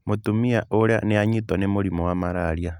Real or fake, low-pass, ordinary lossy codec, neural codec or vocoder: real; 19.8 kHz; none; none